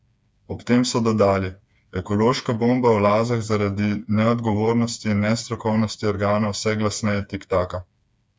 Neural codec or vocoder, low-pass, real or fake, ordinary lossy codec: codec, 16 kHz, 4 kbps, FreqCodec, smaller model; none; fake; none